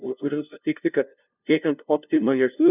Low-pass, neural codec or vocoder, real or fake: 3.6 kHz; codec, 16 kHz, 0.5 kbps, FunCodec, trained on LibriTTS, 25 frames a second; fake